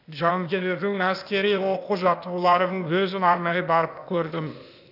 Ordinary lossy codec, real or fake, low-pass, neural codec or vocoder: none; fake; 5.4 kHz; codec, 16 kHz, 0.8 kbps, ZipCodec